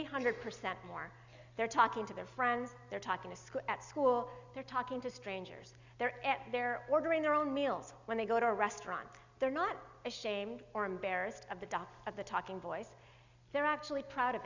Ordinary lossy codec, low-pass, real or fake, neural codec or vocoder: Opus, 64 kbps; 7.2 kHz; real; none